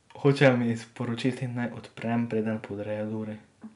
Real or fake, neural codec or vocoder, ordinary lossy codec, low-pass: real; none; none; 10.8 kHz